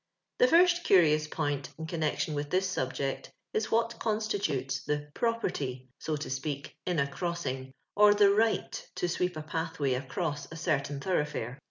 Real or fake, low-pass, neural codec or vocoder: real; 7.2 kHz; none